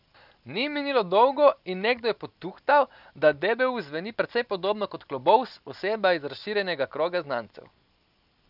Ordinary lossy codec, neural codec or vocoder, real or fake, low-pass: none; none; real; 5.4 kHz